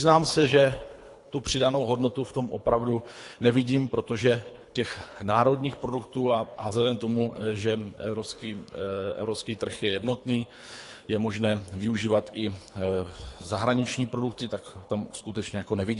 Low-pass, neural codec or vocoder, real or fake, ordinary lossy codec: 10.8 kHz; codec, 24 kHz, 3 kbps, HILCodec; fake; AAC, 48 kbps